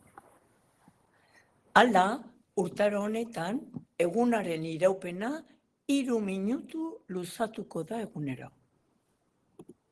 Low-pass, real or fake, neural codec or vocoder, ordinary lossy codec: 10.8 kHz; real; none; Opus, 16 kbps